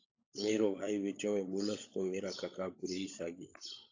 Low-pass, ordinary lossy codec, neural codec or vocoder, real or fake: 7.2 kHz; AAC, 48 kbps; codec, 16 kHz, 16 kbps, FunCodec, trained on LibriTTS, 50 frames a second; fake